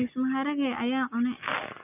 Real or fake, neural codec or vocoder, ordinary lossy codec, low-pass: real; none; none; 3.6 kHz